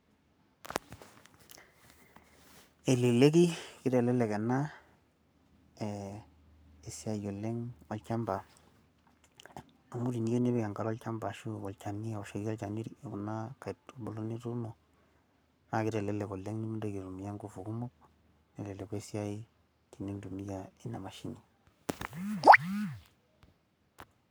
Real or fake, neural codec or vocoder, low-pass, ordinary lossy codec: fake; codec, 44.1 kHz, 7.8 kbps, Pupu-Codec; none; none